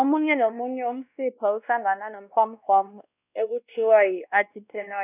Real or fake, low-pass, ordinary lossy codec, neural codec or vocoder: fake; 3.6 kHz; none; codec, 16 kHz, 1 kbps, X-Codec, WavLM features, trained on Multilingual LibriSpeech